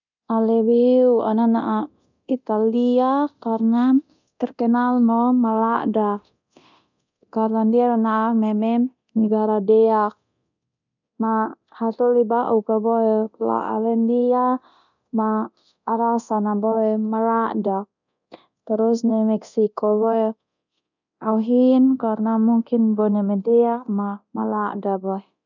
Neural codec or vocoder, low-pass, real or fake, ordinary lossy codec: codec, 24 kHz, 0.9 kbps, DualCodec; 7.2 kHz; fake; none